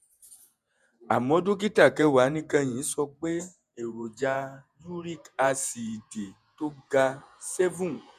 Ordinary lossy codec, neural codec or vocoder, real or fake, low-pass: none; vocoder, 48 kHz, 128 mel bands, Vocos; fake; 14.4 kHz